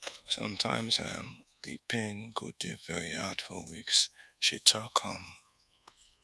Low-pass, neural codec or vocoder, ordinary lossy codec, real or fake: none; codec, 24 kHz, 1.2 kbps, DualCodec; none; fake